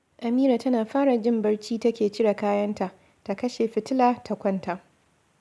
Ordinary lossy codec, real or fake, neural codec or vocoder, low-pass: none; real; none; none